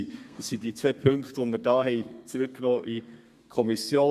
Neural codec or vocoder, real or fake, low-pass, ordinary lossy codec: codec, 32 kHz, 1.9 kbps, SNAC; fake; 14.4 kHz; Opus, 64 kbps